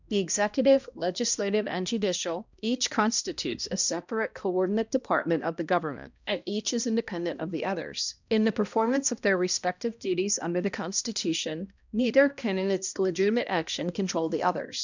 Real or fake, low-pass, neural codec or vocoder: fake; 7.2 kHz; codec, 16 kHz, 1 kbps, X-Codec, HuBERT features, trained on balanced general audio